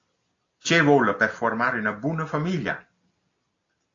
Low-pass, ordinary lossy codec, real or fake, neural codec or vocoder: 7.2 kHz; AAC, 32 kbps; real; none